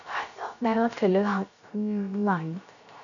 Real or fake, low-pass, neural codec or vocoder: fake; 7.2 kHz; codec, 16 kHz, 0.3 kbps, FocalCodec